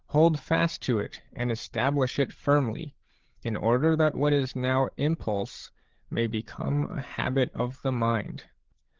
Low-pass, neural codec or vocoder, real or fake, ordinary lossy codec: 7.2 kHz; codec, 16 kHz, 8 kbps, FreqCodec, larger model; fake; Opus, 16 kbps